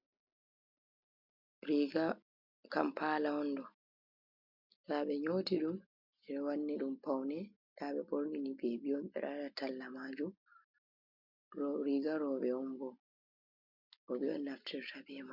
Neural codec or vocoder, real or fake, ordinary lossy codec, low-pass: none; real; MP3, 48 kbps; 5.4 kHz